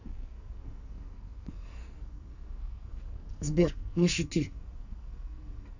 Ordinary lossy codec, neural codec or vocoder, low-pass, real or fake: none; codec, 44.1 kHz, 2.6 kbps, SNAC; 7.2 kHz; fake